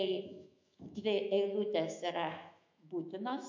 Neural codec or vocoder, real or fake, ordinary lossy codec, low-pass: autoencoder, 48 kHz, 128 numbers a frame, DAC-VAE, trained on Japanese speech; fake; MP3, 64 kbps; 7.2 kHz